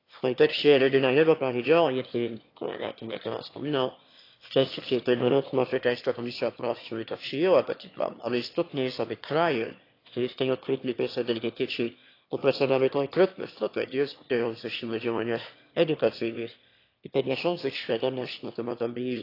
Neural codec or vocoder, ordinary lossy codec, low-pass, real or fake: autoencoder, 22.05 kHz, a latent of 192 numbers a frame, VITS, trained on one speaker; AAC, 32 kbps; 5.4 kHz; fake